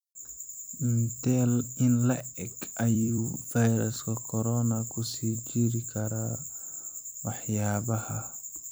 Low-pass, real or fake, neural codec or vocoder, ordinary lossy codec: none; fake; vocoder, 44.1 kHz, 128 mel bands every 256 samples, BigVGAN v2; none